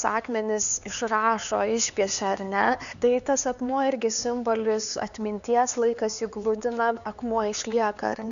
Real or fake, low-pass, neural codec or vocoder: fake; 7.2 kHz; codec, 16 kHz, 4 kbps, X-Codec, HuBERT features, trained on LibriSpeech